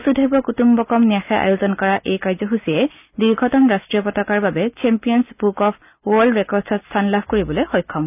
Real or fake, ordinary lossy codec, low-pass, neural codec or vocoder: real; none; 3.6 kHz; none